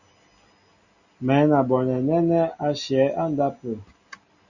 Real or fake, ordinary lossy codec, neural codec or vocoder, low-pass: real; Opus, 64 kbps; none; 7.2 kHz